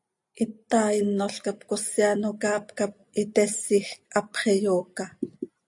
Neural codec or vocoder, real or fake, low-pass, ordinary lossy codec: none; real; 10.8 kHz; AAC, 64 kbps